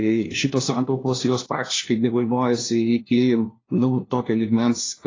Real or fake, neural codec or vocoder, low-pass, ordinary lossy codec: fake; codec, 16 kHz, 1 kbps, FunCodec, trained on LibriTTS, 50 frames a second; 7.2 kHz; AAC, 32 kbps